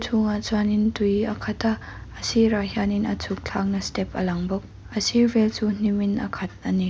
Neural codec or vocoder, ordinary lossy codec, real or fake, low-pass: none; none; real; none